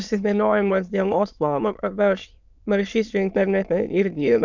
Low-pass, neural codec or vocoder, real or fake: 7.2 kHz; autoencoder, 22.05 kHz, a latent of 192 numbers a frame, VITS, trained on many speakers; fake